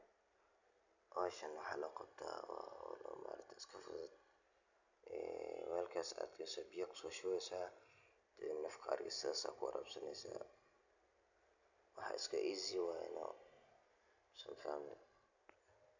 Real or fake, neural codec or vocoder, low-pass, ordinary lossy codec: real; none; 7.2 kHz; none